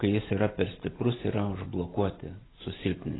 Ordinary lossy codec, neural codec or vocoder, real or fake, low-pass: AAC, 16 kbps; none; real; 7.2 kHz